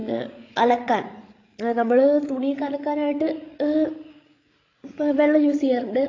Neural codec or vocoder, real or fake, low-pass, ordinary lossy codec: codec, 16 kHz, 16 kbps, FreqCodec, larger model; fake; 7.2 kHz; AAC, 32 kbps